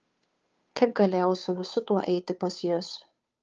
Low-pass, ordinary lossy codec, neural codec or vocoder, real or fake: 7.2 kHz; Opus, 24 kbps; codec, 16 kHz, 2 kbps, FunCodec, trained on Chinese and English, 25 frames a second; fake